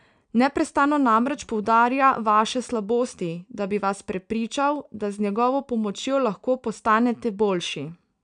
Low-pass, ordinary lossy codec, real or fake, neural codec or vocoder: 9.9 kHz; none; real; none